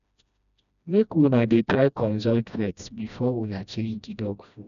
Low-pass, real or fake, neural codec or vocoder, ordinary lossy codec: 7.2 kHz; fake; codec, 16 kHz, 1 kbps, FreqCodec, smaller model; none